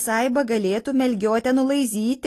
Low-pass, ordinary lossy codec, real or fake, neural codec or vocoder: 14.4 kHz; AAC, 48 kbps; real; none